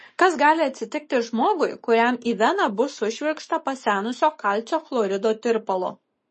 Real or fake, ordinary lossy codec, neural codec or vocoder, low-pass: fake; MP3, 32 kbps; codec, 44.1 kHz, 7.8 kbps, Pupu-Codec; 10.8 kHz